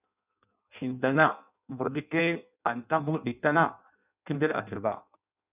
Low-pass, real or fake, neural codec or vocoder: 3.6 kHz; fake; codec, 16 kHz in and 24 kHz out, 0.6 kbps, FireRedTTS-2 codec